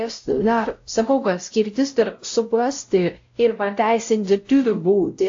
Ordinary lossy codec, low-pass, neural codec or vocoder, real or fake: AAC, 48 kbps; 7.2 kHz; codec, 16 kHz, 0.5 kbps, X-Codec, WavLM features, trained on Multilingual LibriSpeech; fake